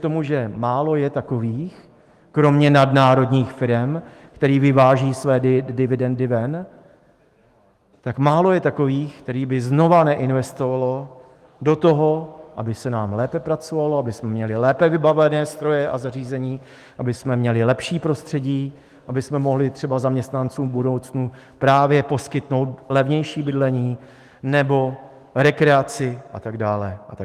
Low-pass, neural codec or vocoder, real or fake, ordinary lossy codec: 14.4 kHz; autoencoder, 48 kHz, 128 numbers a frame, DAC-VAE, trained on Japanese speech; fake; Opus, 24 kbps